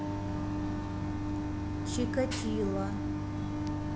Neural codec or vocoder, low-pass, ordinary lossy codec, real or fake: none; none; none; real